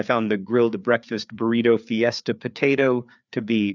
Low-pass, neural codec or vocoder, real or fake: 7.2 kHz; codec, 16 kHz, 4 kbps, FreqCodec, larger model; fake